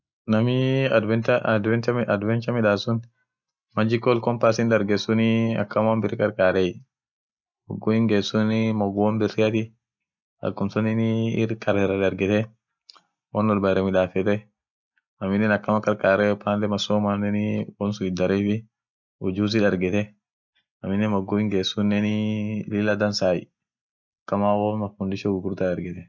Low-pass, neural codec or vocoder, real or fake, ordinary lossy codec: 7.2 kHz; none; real; none